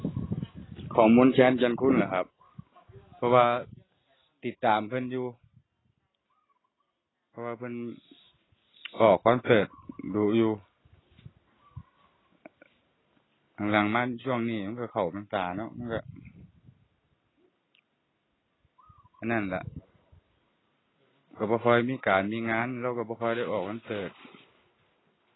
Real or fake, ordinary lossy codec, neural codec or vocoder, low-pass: real; AAC, 16 kbps; none; 7.2 kHz